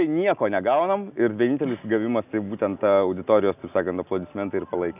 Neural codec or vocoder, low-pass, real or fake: none; 3.6 kHz; real